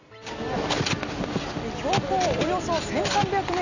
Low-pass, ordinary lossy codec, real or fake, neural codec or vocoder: 7.2 kHz; none; real; none